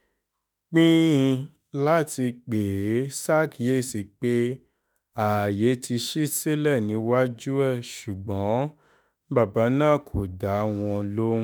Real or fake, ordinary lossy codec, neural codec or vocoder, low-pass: fake; none; autoencoder, 48 kHz, 32 numbers a frame, DAC-VAE, trained on Japanese speech; none